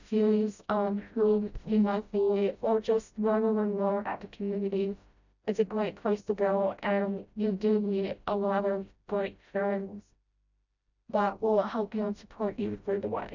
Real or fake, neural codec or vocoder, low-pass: fake; codec, 16 kHz, 0.5 kbps, FreqCodec, smaller model; 7.2 kHz